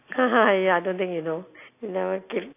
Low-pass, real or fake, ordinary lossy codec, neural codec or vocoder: 3.6 kHz; real; AAC, 24 kbps; none